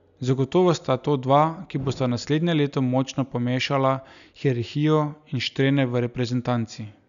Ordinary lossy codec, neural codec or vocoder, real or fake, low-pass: none; none; real; 7.2 kHz